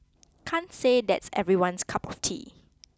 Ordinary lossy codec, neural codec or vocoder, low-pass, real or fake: none; none; none; real